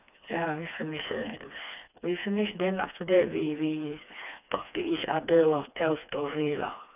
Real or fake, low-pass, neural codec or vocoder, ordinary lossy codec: fake; 3.6 kHz; codec, 16 kHz, 2 kbps, FreqCodec, smaller model; none